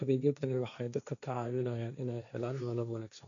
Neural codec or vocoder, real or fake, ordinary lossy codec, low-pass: codec, 16 kHz, 1.1 kbps, Voila-Tokenizer; fake; none; 7.2 kHz